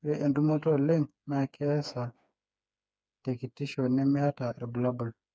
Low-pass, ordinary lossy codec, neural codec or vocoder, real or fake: none; none; codec, 16 kHz, 4 kbps, FreqCodec, smaller model; fake